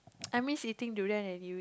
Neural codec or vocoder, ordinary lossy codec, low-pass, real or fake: none; none; none; real